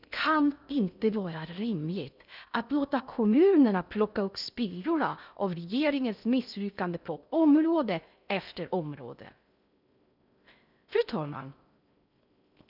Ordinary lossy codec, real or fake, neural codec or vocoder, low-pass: none; fake; codec, 16 kHz in and 24 kHz out, 0.6 kbps, FocalCodec, streaming, 2048 codes; 5.4 kHz